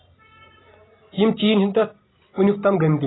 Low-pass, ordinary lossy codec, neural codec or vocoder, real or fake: 7.2 kHz; AAC, 16 kbps; none; real